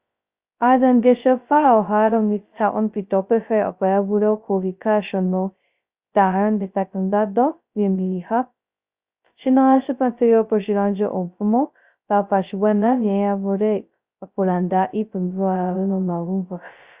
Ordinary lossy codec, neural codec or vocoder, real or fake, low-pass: Opus, 64 kbps; codec, 16 kHz, 0.2 kbps, FocalCodec; fake; 3.6 kHz